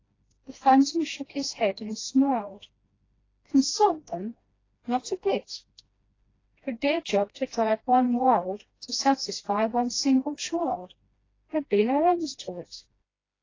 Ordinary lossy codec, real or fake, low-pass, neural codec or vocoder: AAC, 32 kbps; fake; 7.2 kHz; codec, 16 kHz, 1 kbps, FreqCodec, smaller model